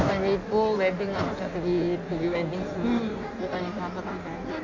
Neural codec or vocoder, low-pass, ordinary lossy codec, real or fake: codec, 16 kHz in and 24 kHz out, 1.1 kbps, FireRedTTS-2 codec; 7.2 kHz; AAC, 48 kbps; fake